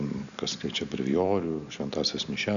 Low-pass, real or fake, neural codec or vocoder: 7.2 kHz; real; none